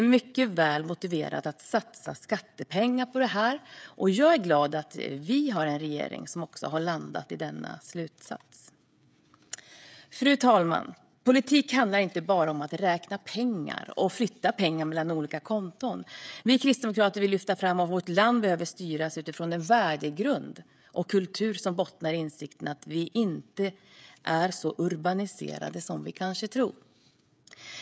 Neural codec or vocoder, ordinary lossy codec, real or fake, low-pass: codec, 16 kHz, 16 kbps, FreqCodec, smaller model; none; fake; none